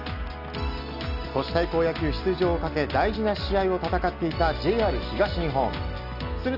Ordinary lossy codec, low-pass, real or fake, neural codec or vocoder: none; 5.4 kHz; real; none